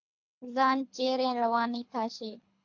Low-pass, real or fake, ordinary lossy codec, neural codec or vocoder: 7.2 kHz; fake; AAC, 48 kbps; codec, 24 kHz, 3 kbps, HILCodec